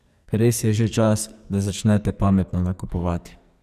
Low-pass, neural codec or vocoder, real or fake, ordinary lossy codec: 14.4 kHz; codec, 44.1 kHz, 2.6 kbps, SNAC; fake; none